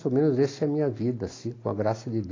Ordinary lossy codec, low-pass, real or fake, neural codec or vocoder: AAC, 32 kbps; 7.2 kHz; real; none